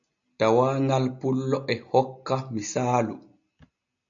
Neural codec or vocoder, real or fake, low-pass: none; real; 7.2 kHz